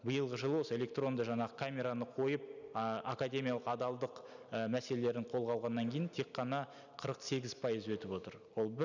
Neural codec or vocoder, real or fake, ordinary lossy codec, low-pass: none; real; none; 7.2 kHz